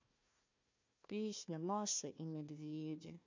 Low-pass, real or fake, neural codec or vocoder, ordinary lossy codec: 7.2 kHz; fake; codec, 16 kHz, 1 kbps, FunCodec, trained on Chinese and English, 50 frames a second; MP3, 64 kbps